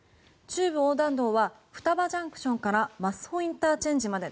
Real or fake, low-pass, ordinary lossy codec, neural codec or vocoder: real; none; none; none